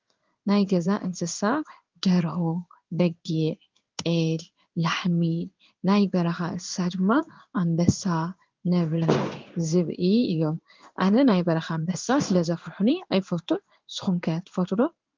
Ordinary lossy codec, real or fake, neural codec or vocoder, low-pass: Opus, 24 kbps; fake; codec, 16 kHz in and 24 kHz out, 1 kbps, XY-Tokenizer; 7.2 kHz